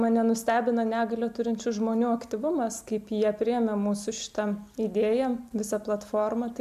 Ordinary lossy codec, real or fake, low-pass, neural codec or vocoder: MP3, 96 kbps; real; 14.4 kHz; none